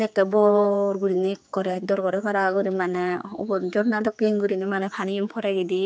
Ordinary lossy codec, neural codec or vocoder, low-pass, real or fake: none; codec, 16 kHz, 4 kbps, X-Codec, HuBERT features, trained on general audio; none; fake